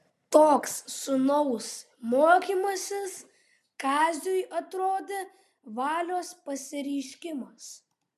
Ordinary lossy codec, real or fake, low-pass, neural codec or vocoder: MP3, 96 kbps; real; 14.4 kHz; none